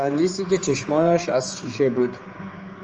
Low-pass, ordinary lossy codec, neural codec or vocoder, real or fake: 7.2 kHz; Opus, 24 kbps; codec, 16 kHz, 4 kbps, X-Codec, HuBERT features, trained on general audio; fake